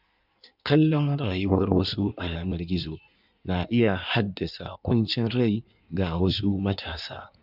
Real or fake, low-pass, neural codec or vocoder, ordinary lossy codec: fake; 5.4 kHz; codec, 16 kHz in and 24 kHz out, 1.1 kbps, FireRedTTS-2 codec; none